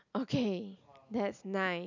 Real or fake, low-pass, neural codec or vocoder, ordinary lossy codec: real; 7.2 kHz; none; none